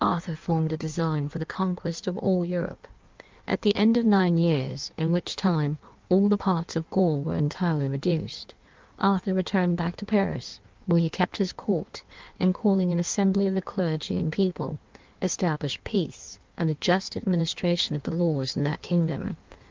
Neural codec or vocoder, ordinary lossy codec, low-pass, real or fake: codec, 16 kHz in and 24 kHz out, 1.1 kbps, FireRedTTS-2 codec; Opus, 32 kbps; 7.2 kHz; fake